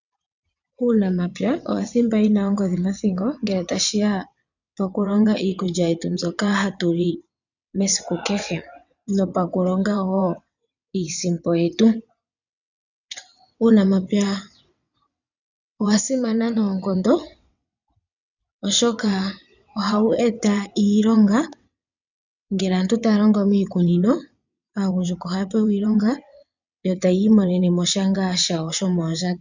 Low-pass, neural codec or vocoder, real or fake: 7.2 kHz; vocoder, 22.05 kHz, 80 mel bands, WaveNeXt; fake